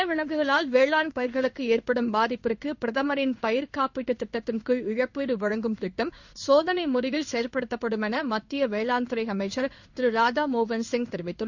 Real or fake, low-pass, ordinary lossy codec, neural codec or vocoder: fake; 7.2 kHz; none; codec, 16 kHz in and 24 kHz out, 1 kbps, XY-Tokenizer